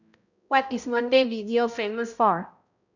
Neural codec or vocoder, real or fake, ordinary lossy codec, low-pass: codec, 16 kHz, 0.5 kbps, X-Codec, HuBERT features, trained on balanced general audio; fake; none; 7.2 kHz